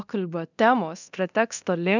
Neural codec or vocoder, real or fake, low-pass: codec, 24 kHz, 0.9 kbps, DualCodec; fake; 7.2 kHz